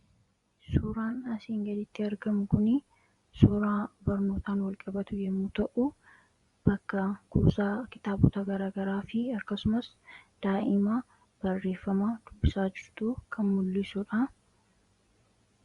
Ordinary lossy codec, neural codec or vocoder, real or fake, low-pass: Opus, 64 kbps; vocoder, 24 kHz, 100 mel bands, Vocos; fake; 10.8 kHz